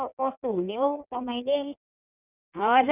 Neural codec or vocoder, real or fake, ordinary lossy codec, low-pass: codec, 16 kHz in and 24 kHz out, 1.1 kbps, FireRedTTS-2 codec; fake; none; 3.6 kHz